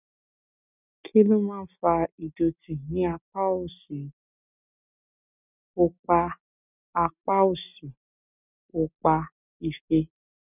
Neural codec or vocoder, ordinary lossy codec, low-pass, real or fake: none; none; 3.6 kHz; real